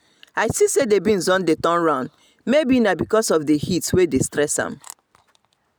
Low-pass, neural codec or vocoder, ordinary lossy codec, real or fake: none; none; none; real